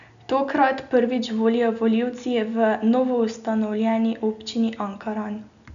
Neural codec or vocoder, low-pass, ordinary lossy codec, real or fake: none; 7.2 kHz; none; real